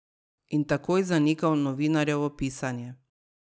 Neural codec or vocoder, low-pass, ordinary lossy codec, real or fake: none; none; none; real